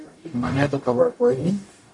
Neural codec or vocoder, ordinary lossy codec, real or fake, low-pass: codec, 44.1 kHz, 0.9 kbps, DAC; MP3, 48 kbps; fake; 10.8 kHz